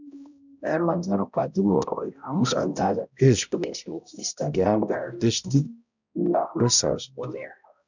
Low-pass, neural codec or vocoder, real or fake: 7.2 kHz; codec, 16 kHz, 0.5 kbps, X-Codec, HuBERT features, trained on balanced general audio; fake